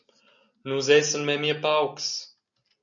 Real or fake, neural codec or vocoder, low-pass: real; none; 7.2 kHz